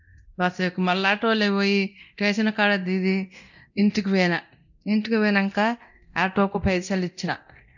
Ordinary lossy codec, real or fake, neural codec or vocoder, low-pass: none; fake; codec, 24 kHz, 0.9 kbps, DualCodec; 7.2 kHz